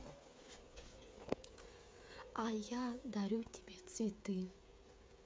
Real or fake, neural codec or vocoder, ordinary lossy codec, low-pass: fake; codec, 16 kHz, 16 kbps, FreqCodec, smaller model; none; none